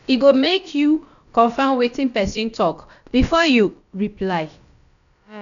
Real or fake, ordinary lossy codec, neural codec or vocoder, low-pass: fake; none; codec, 16 kHz, about 1 kbps, DyCAST, with the encoder's durations; 7.2 kHz